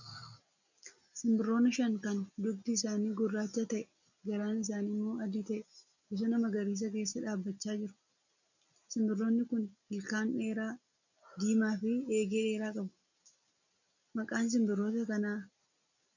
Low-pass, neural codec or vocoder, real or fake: 7.2 kHz; none; real